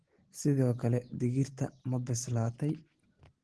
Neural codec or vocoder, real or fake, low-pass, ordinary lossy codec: none; real; 10.8 kHz; Opus, 16 kbps